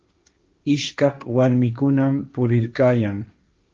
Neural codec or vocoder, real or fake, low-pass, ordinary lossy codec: codec, 16 kHz, 1.1 kbps, Voila-Tokenizer; fake; 7.2 kHz; Opus, 24 kbps